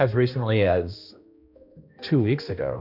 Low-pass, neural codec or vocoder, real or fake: 5.4 kHz; codec, 16 kHz, 1 kbps, X-Codec, HuBERT features, trained on balanced general audio; fake